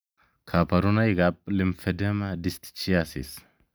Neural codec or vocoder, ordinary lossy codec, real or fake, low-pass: none; none; real; none